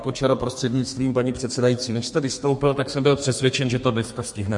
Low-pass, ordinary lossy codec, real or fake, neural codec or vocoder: 10.8 kHz; MP3, 48 kbps; fake; codec, 32 kHz, 1.9 kbps, SNAC